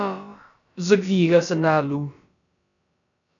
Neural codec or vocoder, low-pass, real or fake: codec, 16 kHz, about 1 kbps, DyCAST, with the encoder's durations; 7.2 kHz; fake